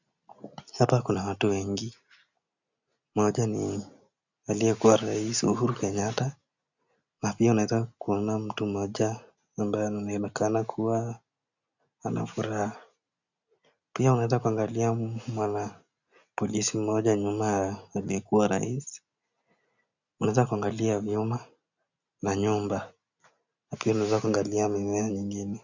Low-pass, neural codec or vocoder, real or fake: 7.2 kHz; none; real